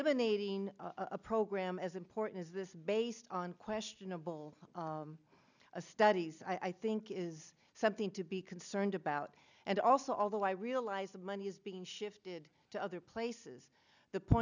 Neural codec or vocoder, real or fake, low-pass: none; real; 7.2 kHz